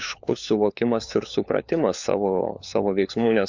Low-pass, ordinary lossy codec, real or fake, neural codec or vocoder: 7.2 kHz; MP3, 64 kbps; fake; codec, 16 kHz, 4 kbps, FunCodec, trained on LibriTTS, 50 frames a second